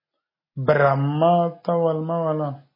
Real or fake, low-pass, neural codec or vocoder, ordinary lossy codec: real; 5.4 kHz; none; MP3, 24 kbps